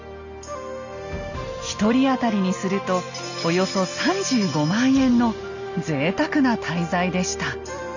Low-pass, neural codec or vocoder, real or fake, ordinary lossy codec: 7.2 kHz; none; real; none